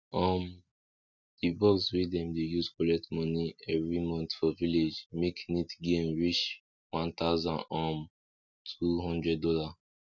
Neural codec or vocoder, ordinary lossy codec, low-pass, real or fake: none; none; 7.2 kHz; real